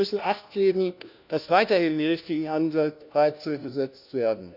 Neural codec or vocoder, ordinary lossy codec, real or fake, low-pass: codec, 16 kHz, 1 kbps, FunCodec, trained on LibriTTS, 50 frames a second; none; fake; 5.4 kHz